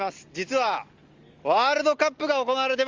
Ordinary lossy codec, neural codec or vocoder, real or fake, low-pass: Opus, 32 kbps; none; real; 7.2 kHz